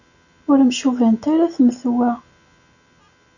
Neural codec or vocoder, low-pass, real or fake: none; 7.2 kHz; real